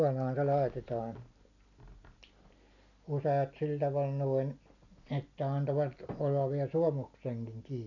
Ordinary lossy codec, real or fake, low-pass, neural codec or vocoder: none; real; 7.2 kHz; none